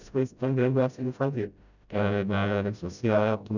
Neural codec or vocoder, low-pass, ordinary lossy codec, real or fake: codec, 16 kHz, 0.5 kbps, FreqCodec, smaller model; 7.2 kHz; none; fake